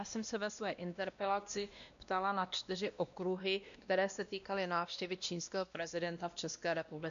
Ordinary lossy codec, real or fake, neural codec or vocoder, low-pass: AAC, 96 kbps; fake; codec, 16 kHz, 1 kbps, X-Codec, WavLM features, trained on Multilingual LibriSpeech; 7.2 kHz